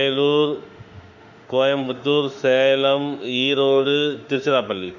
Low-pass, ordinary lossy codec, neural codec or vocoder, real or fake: 7.2 kHz; none; autoencoder, 48 kHz, 32 numbers a frame, DAC-VAE, trained on Japanese speech; fake